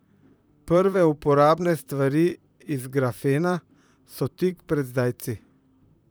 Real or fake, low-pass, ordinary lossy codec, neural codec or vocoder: fake; none; none; vocoder, 44.1 kHz, 128 mel bands, Pupu-Vocoder